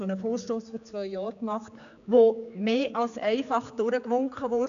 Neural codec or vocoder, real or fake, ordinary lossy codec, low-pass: codec, 16 kHz, 4 kbps, X-Codec, HuBERT features, trained on general audio; fake; none; 7.2 kHz